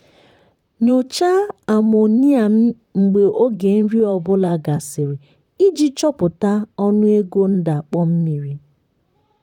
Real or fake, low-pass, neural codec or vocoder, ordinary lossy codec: fake; 19.8 kHz; vocoder, 44.1 kHz, 128 mel bands, Pupu-Vocoder; none